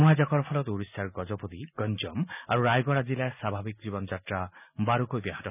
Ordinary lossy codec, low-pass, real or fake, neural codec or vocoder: none; 3.6 kHz; real; none